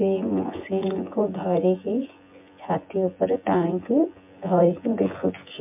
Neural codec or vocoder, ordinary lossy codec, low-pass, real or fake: vocoder, 24 kHz, 100 mel bands, Vocos; MP3, 32 kbps; 3.6 kHz; fake